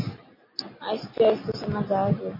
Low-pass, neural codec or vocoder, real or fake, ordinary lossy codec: 5.4 kHz; none; real; MP3, 24 kbps